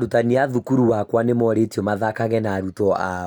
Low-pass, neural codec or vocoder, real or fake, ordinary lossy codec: none; none; real; none